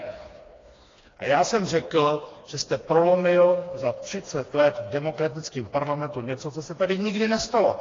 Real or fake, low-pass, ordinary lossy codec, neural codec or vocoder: fake; 7.2 kHz; AAC, 32 kbps; codec, 16 kHz, 2 kbps, FreqCodec, smaller model